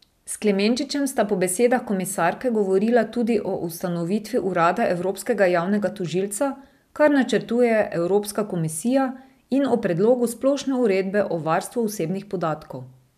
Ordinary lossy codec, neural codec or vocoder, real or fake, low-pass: none; none; real; 14.4 kHz